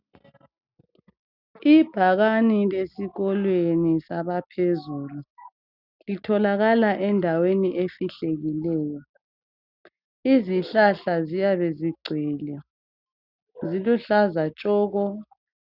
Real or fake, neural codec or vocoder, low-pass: real; none; 5.4 kHz